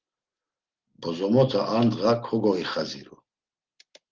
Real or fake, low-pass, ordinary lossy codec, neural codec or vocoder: real; 7.2 kHz; Opus, 16 kbps; none